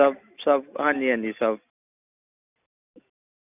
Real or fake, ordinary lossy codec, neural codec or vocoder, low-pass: real; none; none; 3.6 kHz